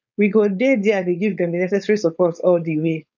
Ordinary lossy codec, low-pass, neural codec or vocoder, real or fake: none; 7.2 kHz; codec, 16 kHz, 4.8 kbps, FACodec; fake